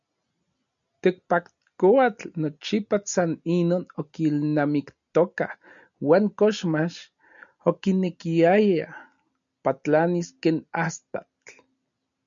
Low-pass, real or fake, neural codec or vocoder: 7.2 kHz; real; none